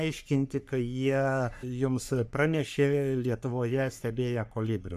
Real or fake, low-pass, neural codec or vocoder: fake; 14.4 kHz; codec, 44.1 kHz, 3.4 kbps, Pupu-Codec